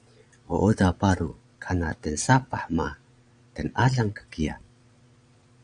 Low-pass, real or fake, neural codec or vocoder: 9.9 kHz; fake; vocoder, 22.05 kHz, 80 mel bands, Vocos